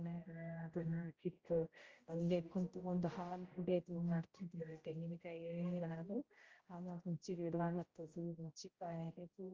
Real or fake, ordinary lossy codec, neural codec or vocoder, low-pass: fake; Opus, 32 kbps; codec, 16 kHz, 0.5 kbps, X-Codec, HuBERT features, trained on general audio; 7.2 kHz